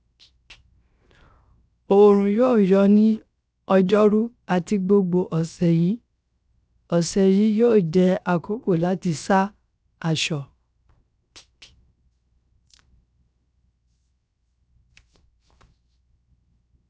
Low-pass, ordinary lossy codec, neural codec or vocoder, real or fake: none; none; codec, 16 kHz, 0.7 kbps, FocalCodec; fake